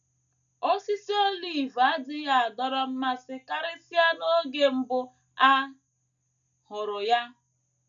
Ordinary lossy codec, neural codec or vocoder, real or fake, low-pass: none; none; real; 7.2 kHz